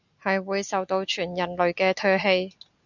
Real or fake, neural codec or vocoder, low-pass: real; none; 7.2 kHz